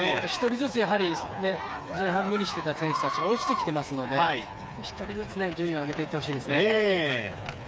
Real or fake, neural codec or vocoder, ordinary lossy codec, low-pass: fake; codec, 16 kHz, 4 kbps, FreqCodec, smaller model; none; none